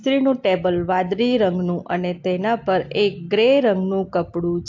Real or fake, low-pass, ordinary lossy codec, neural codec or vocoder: real; 7.2 kHz; AAC, 48 kbps; none